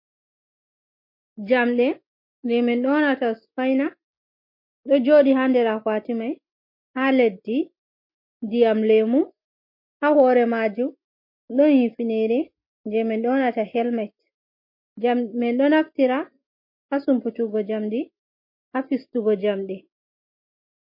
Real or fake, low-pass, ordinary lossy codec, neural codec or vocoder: real; 5.4 kHz; MP3, 32 kbps; none